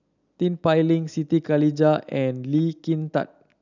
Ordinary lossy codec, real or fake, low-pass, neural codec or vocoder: none; real; 7.2 kHz; none